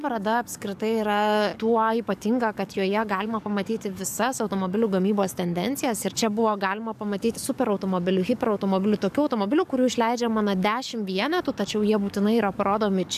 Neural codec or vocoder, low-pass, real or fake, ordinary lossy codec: codec, 44.1 kHz, 7.8 kbps, DAC; 14.4 kHz; fake; AAC, 96 kbps